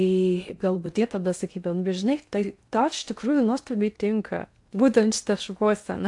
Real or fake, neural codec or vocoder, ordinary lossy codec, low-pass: fake; codec, 16 kHz in and 24 kHz out, 0.6 kbps, FocalCodec, streaming, 2048 codes; AAC, 64 kbps; 10.8 kHz